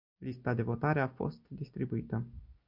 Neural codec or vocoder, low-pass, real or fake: none; 5.4 kHz; real